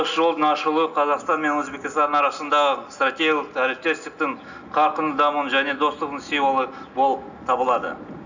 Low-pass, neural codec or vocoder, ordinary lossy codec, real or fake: 7.2 kHz; none; none; real